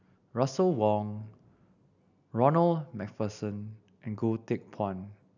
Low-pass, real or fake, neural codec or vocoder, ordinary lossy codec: 7.2 kHz; real; none; none